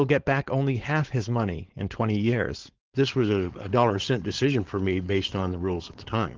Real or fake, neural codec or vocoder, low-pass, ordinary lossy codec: fake; codec, 16 kHz, 4.8 kbps, FACodec; 7.2 kHz; Opus, 16 kbps